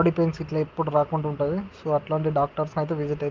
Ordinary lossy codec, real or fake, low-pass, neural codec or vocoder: Opus, 32 kbps; real; 7.2 kHz; none